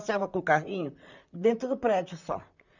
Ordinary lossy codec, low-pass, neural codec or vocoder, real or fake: none; 7.2 kHz; vocoder, 44.1 kHz, 128 mel bands, Pupu-Vocoder; fake